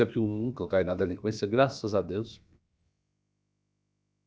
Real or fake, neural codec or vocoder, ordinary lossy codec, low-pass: fake; codec, 16 kHz, about 1 kbps, DyCAST, with the encoder's durations; none; none